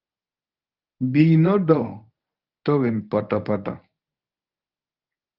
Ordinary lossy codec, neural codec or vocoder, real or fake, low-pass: Opus, 24 kbps; codec, 24 kHz, 0.9 kbps, WavTokenizer, medium speech release version 1; fake; 5.4 kHz